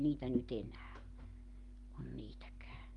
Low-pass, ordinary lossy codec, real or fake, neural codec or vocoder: 10.8 kHz; Opus, 32 kbps; real; none